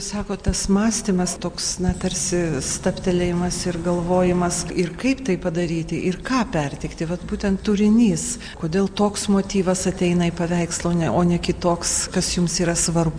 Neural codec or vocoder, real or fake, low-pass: none; real; 9.9 kHz